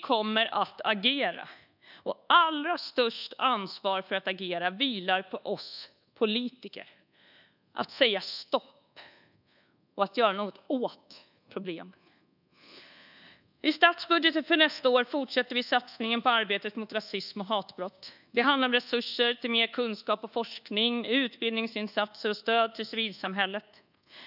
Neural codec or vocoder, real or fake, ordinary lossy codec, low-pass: codec, 24 kHz, 1.2 kbps, DualCodec; fake; none; 5.4 kHz